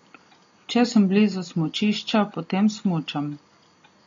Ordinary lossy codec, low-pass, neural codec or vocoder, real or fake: AAC, 32 kbps; 7.2 kHz; none; real